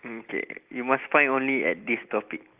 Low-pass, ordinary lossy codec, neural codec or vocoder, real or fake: 3.6 kHz; Opus, 24 kbps; none; real